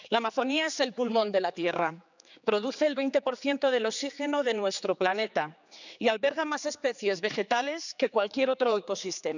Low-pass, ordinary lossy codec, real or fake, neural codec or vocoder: 7.2 kHz; none; fake; codec, 16 kHz, 4 kbps, X-Codec, HuBERT features, trained on general audio